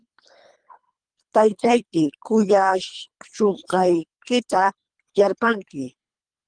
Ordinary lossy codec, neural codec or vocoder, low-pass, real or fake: Opus, 32 kbps; codec, 24 kHz, 3 kbps, HILCodec; 9.9 kHz; fake